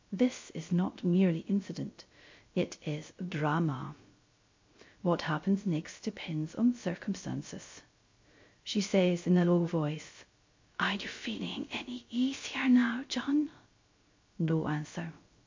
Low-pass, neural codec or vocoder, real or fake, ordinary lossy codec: 7.2 kHz; codec, 16 kHz, 0.3 kbps, FocalCodec; fake; MP3, 48 kbps